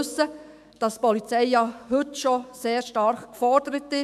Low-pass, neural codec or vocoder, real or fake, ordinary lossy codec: 14.4 kHz; autoencoder, 48 kHz, 128 numbers a frame, DAC-VAE, trained on Japanese speech; fake; MP3, 96 kbps